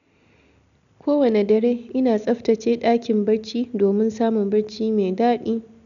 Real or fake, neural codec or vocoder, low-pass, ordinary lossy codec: real; none; 7.2 kHz; none